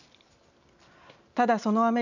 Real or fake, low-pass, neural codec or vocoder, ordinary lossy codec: real; 7.2 kHz; none; none